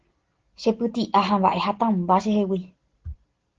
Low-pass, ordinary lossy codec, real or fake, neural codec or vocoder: 7.2 kHz; Opus, 16 kbps; real; none